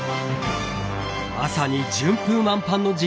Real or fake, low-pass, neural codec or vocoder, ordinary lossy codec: real; none; none; none